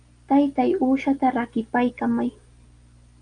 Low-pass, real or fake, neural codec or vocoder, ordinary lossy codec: 9.9 kHz; real; none; Opus, 32 kbps